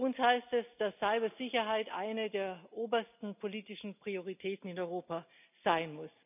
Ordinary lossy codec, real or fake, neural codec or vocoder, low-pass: none; real; none; 3.6 kHz